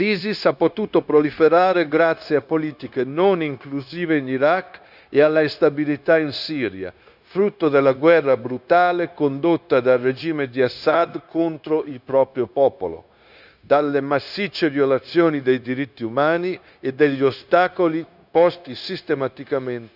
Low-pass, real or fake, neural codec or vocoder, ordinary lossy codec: 5.4 kHz; fake; codec, 16 kHz, 0.9 kbps, LongCat-Audio-Codec; none